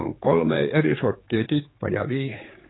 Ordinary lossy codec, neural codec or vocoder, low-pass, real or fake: AAC, 16 kbps; codec, 16 kHz, 4 kbps, X-Codec, HuBERT features, trained on general audio; 7.2 kHz; fake